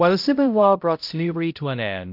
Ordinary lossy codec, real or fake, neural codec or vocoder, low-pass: MP3, 48 kbps; fake; codec, 16 kHz, 0.5 kbps, X-Codec, HuBERT features, trained on balanced general audio; 5.4 kHz